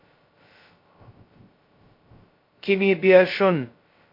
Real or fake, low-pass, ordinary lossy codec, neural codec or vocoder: fake; 5.4 kHz; MP3, 32 kbps; codec, 16 kHz, 0.2 kbps, FocalCodec